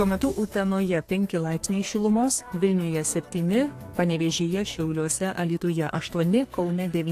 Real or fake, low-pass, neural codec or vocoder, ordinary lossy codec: fake; 14.4 kHz; codec, 32 kHz, 1.9 kbps, SNAC; AAC, 64 kbps